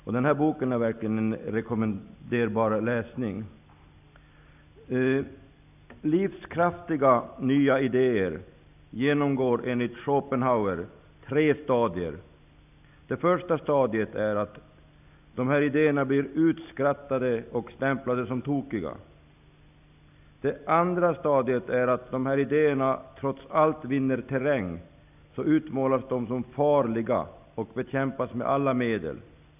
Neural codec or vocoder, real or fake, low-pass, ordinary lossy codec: none; real; 3.6 kHz; none